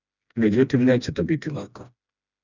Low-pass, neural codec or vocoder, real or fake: 7.2 kHz; codec, 16 kHz, 1 kbps, FreqCodec, smaller model; fake